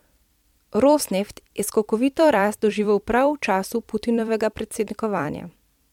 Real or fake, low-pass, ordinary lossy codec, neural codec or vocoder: fake; 19.8 kHz; MP3, 96 kbps; vocoder, 44.1 kHz, 128 mel bands every 256 samples, BigVGAN v2